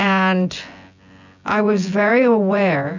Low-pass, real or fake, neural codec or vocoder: 7.2 kHz; fake; vocoder, 24 kHz, 100 mel bands, Vocos